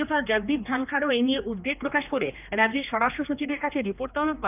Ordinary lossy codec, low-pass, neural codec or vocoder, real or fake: none; 3.6 kHz; codec, 16 kHz, 2 kbps, X-Codec, HuBERT features, trained on general audio; fake